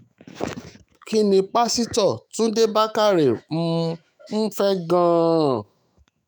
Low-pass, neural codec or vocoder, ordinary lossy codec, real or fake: none; autoencoder, 48 kHz, 128 numbers a frame, DAC-VAE, trained on Japanese speech; none; fake